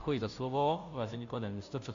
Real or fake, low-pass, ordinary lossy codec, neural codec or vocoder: fake; 7.2 kHz; AAC, 64 kbps; codec, 16 kHz, 0.5 kbps, FunCodec, trained on Chinese and English, 25 frames a second